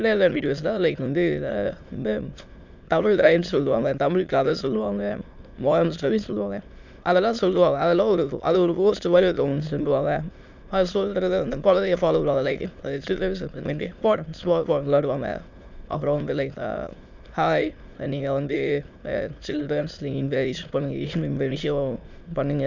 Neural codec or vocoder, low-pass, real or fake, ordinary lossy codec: autoencoder, 22.05 kHz, a latent of 192 numbers a frame, VITS, trained on many speakers; 7.2 kHz; fake; MP3, 64 kbps